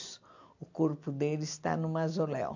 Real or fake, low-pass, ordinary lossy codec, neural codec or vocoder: real; 7.2 kHz; AAC, 48 kbps; none